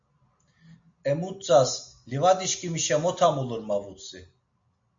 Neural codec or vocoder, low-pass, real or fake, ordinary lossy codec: none; 7.2 kHz; real; MP3, 48 kbps